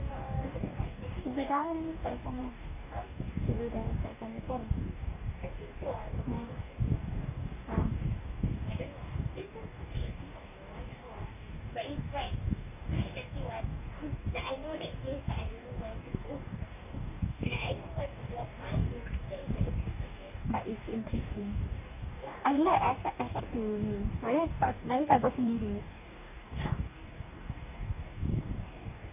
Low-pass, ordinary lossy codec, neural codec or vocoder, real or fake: 3.6 kHz; none; codec, 44.1 kHz, 2.6 kbps, DAC; fake